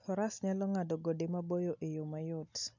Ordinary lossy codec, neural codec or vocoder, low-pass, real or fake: none; none; 7.2 kHz; real